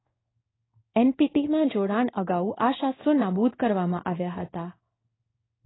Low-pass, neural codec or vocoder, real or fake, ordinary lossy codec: 7.2 kHz; codec, 16 kHz in and 24 kHz out, 1 kbps, XY-Tokenizer; fake; AAC, 16 kbps